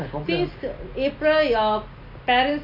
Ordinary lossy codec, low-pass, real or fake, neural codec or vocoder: MP3, 32 kbps; 5.4 kHz; real; none